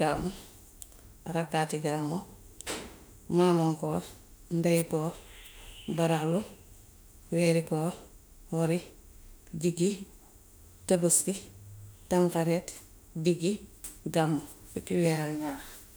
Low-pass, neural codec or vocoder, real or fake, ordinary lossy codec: none; autoencoder, 48 kHz, 32 numbers a frame, DAC-VAE, trained on Japanese speech; fake; none